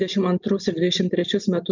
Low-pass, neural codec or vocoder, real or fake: 7.2 kHz; none; real